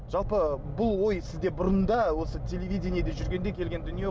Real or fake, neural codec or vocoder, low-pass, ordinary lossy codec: real; none; none; none